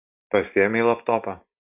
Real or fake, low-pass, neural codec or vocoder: real; 3.6 kHz; none